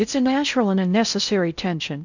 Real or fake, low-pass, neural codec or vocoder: fake; 7.2 kHz; codec, 16 kHz in and 24 kHz out, 0.6 kbps, FocalCodec, streaming, 4096 codes